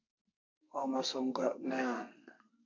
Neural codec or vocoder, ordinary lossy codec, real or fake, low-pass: codec, 32 kHz, 1.9 kbps, SNAC; MP3, 64 kbps; fake; 7.2 kHz